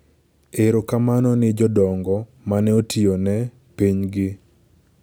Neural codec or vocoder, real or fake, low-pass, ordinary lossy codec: none; real; none; none